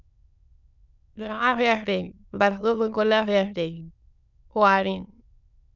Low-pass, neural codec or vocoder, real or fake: 7.2 kHz; autoencoder, 22.05 kHz, a latent of 192 numbers a frame, VITS, trained on many speakers; fake